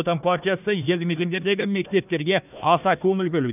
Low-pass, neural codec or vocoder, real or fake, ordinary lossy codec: 3.6 kHz; codec, 16 kHz, 1 kbps, FunCodec, trained on Chinese and English, 50 frames a second; fake; none